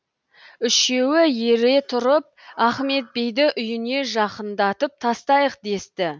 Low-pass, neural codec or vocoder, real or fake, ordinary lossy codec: none; none; real; none